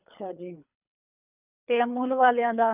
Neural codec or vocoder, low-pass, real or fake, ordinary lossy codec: codec, 24 kHz, 3 kbps, HILCodec; 3.6 kHz; fake; none